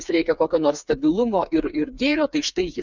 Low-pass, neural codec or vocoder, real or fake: 7.2 kHz; codec, 16 kHz, 4 kbps, FreqCodec, smaller model; fake